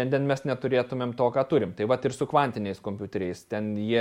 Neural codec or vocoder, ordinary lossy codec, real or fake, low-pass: none; MP3, 64 kbps; real; 19.8 kHz